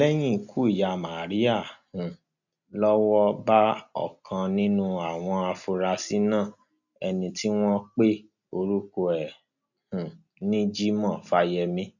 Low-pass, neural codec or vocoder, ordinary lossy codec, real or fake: 7.2 kHz; none; none; real